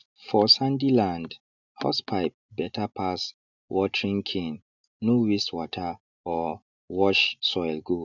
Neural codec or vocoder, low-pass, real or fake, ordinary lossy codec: none; 7.2 kHz; real; none